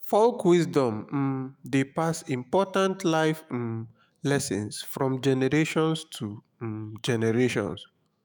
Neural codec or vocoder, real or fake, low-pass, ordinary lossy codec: autoencoder, 48 kHz, 128 numbers a frame, DAC-VAE, trained on Japanese speech; fake; none; none